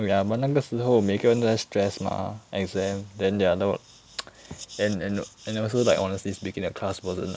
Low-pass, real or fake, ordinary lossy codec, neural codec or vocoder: none; real; none; none